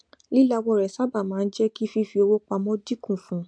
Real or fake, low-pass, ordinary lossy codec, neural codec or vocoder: real; 9.9 kHz; MP3, 64 kbps; none